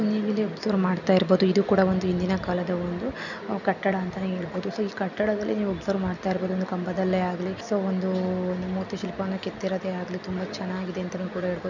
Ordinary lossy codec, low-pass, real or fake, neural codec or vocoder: none; 7.2 kHz; real; none